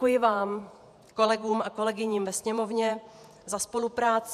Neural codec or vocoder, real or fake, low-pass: vocoder, 48 kHz, 128 mel bands, Vocos; fake; 14.4 kHz